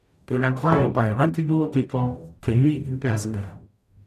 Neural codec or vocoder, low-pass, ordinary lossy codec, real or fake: codec, 44.1 kHz, 0.9 kbps, DAC; 14.4 kHz; none; fake